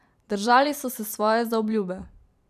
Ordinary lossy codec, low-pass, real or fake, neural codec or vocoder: none; 14.4 kHz; fake; vocoder, 44.1 kHz, 128 mel bands every 512 samples, BigVGAN v2